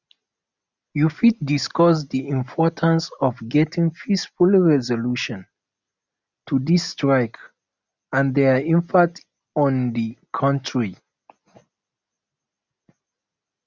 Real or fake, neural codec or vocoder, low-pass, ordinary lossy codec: real; none; 7.2 kHz; none